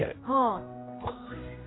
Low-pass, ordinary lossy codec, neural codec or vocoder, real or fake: 7.2 kHz; AAC, 16 kbps; codec, 16 kHz, 1 kbps, X-Codec, HuBERT features, trained on general audio; fake